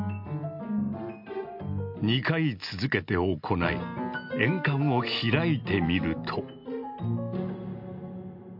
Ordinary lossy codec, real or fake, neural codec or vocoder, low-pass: MP3, 48 kbps; real; none; 5.4 kHz